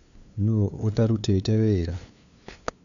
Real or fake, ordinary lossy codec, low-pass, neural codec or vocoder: fake; none; 7.2 kHz; codec, 16 kHz, 2 kbps, FunCodec, trained on LibriTTS, 25 frames a second